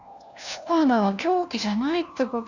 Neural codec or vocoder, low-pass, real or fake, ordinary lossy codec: codec, 16 kHz, 1 kbps, FunCodec, trained on LibriTTS, 50 frames a second; 7.2 kHz; fake; none